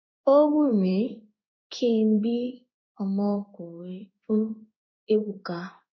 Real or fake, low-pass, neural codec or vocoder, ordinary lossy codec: fake; 7.2 kHz; codec, 16 kHz in and 24 kHz out, 1 kbps, XY-Tokenizer; none